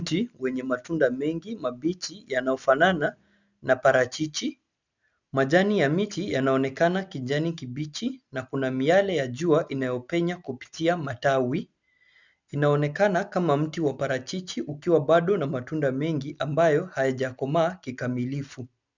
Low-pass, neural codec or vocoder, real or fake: 7.2 kHz; none; real